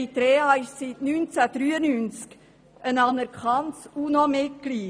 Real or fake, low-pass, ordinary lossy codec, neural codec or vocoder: real; none; none; none